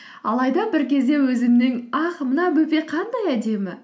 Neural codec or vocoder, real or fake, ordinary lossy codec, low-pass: none; real; none; none